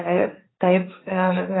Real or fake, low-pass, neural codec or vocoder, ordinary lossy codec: fake; 7.2 kHz; codec, 16 kHz, 1.1 kbps, Voila-Tokenizer; AAC, 16 kbps